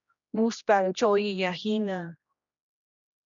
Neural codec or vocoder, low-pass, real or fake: codec, 16 kHz, 1 kbps, X-Codec, HuBERT features, trained on general audio; 7.2 kHz; fake